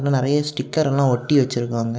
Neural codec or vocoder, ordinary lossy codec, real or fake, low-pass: none; none; real; none